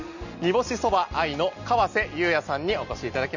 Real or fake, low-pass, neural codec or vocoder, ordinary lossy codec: real; 7.2 kHz; none; none